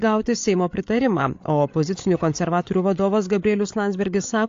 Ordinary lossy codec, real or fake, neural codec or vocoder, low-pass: AAC, 48 kbps; fake; codec, 16 kHz, 8 kbps, FreqCodec, larger model; 7.2 kHz